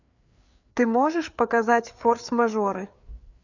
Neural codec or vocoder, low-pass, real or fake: codec, 16 kHz, 4 kbps, FreqCodec, larger model; 7.2 kHz; fake